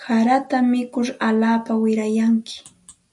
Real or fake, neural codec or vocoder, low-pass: real; none; 10.8 kHz